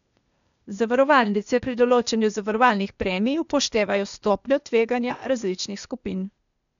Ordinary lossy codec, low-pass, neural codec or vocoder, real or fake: none; 7.2 kHz; codec, 16 kHz, 0.8 kbps, ZipCodec; fake